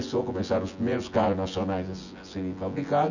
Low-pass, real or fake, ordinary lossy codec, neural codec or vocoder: 7.2 kHz; fake; none; vocoder, 24 kHz, 100 mel bands, Vocos